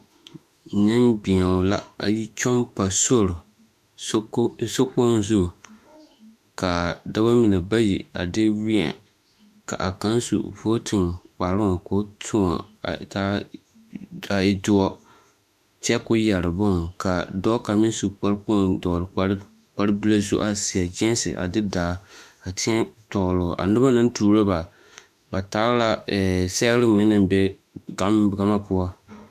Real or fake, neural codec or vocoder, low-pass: fake; autoencoder, 48 kHz, 32 numbers a frame, DAC-VAE, trained on Japanese speech; 14.4 kHz